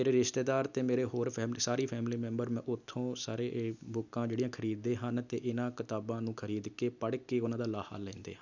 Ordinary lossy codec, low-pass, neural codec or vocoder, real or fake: none; 7.2 kHz; none; real